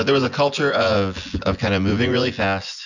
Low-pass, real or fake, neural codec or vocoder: 7.2 kHz; fake; vocoder, 24 kHz, 100 mel bands, Vocos